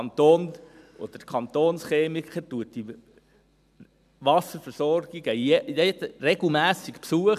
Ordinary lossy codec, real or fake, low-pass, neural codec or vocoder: none; real; 14.4 kHz; none